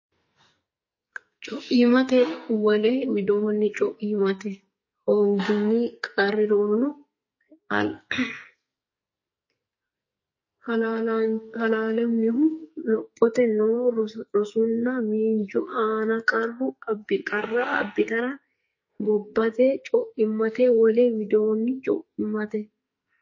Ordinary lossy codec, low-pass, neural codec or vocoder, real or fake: MP3, 32 kbps; 7.2 kHz; codec, 44.1 kHz, 2.6 kbps, SNAC; fake